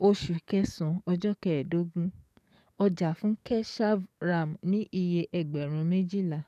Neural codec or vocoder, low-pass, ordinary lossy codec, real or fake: codec, 44.1 kHz, 7.8 kbps, DAC; 14.4 kHz; none; fake